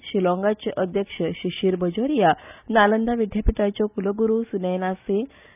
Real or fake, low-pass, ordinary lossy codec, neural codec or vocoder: real; 3.6 kHz; none; none